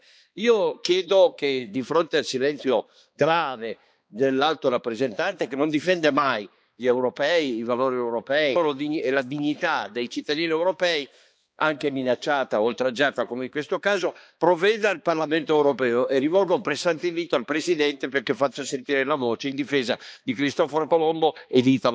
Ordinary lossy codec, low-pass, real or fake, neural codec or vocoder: none; none; fake; codec, 16 kHz, 2 kbps, X-Codec, HuBERT features, trained on balanced general audio